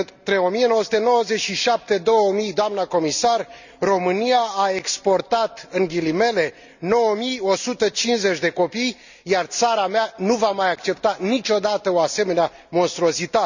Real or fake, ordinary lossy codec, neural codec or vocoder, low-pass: real; none; none; 7.2 kHz